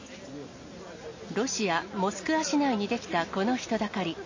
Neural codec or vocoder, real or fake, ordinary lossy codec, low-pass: none; real; MP3, 32 kbps; 7.2 kHz